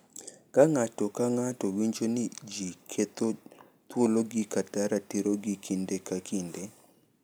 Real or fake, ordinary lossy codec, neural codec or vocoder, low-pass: real; none; none; none